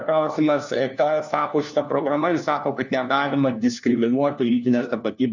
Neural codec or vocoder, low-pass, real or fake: codec, 16 kHz, 1 kbps, FunCodec, trained on LibriTTS, 50 frames a second; 7.2 kHz; fake